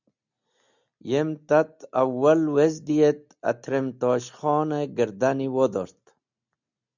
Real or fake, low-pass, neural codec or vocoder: real; 7.2 kHz; none